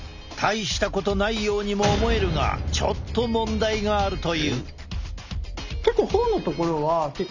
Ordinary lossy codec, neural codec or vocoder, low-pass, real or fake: none; none; 7.2 kHz; real